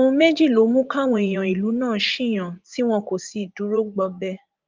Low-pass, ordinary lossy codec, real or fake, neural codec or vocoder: 7.2 kHz; Opus, 32 kbps; fake; vocoder, 44.1 kHz, 80 mel bands, Vocos